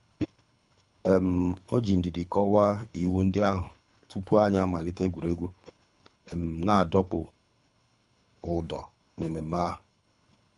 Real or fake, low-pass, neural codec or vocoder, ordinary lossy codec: fake; 10.8 kHz; codec, 24 kHz, 3 kbps, HILCodec; none